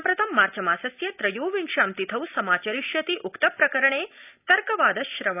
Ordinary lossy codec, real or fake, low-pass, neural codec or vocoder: none; real; 3.6 kHz; none